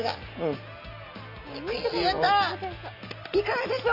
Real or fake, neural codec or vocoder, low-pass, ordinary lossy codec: real; none; 5.4 kHz; none